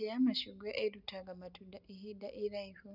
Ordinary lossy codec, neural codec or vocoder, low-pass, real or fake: none; none; 5.4 kHz; real